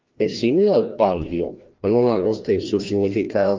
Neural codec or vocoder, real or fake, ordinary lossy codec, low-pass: codec, 16 kHz, 1 kbps, FreqCodec, larger model; fake; Opus, 32 kbps; 7.2 kHz